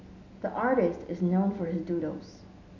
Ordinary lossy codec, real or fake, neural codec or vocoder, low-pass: none; real; none; 7.2 kHz